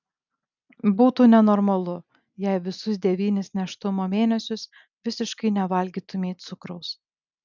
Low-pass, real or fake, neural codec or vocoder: 7.2 kHz; real; none